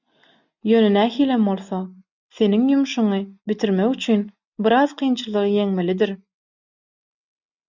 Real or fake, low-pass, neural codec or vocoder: real; 7.2 kHz; none